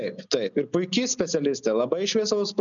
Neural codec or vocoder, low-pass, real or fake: none; 7.2 kHz; real